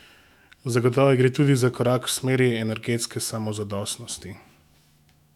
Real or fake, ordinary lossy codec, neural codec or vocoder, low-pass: fake; none; autoencoder, 48 kHz, 128 numbers a frame, DAC-VAE, trained on Japanese speech; 19.8 kHz